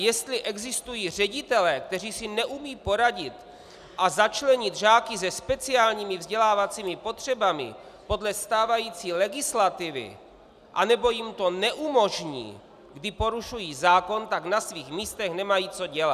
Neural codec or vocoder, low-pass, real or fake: none; 14.4 kHz; real